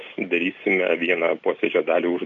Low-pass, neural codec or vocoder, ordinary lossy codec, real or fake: 7.2 kHz; none; AAC, 64 kbps; real